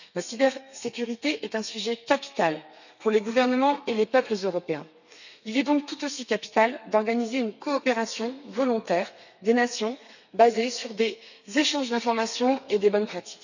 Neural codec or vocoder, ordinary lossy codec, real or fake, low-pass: codec, 32 kHz, 1.9 kbps, SNAC; none; fake; 7.2 kHz